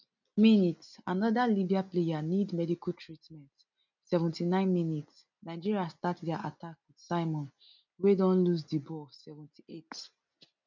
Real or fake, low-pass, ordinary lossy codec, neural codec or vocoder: real; 7.2 kHz; none; none